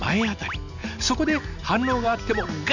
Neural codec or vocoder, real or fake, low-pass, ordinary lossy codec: none; real; 7.2 kHz; none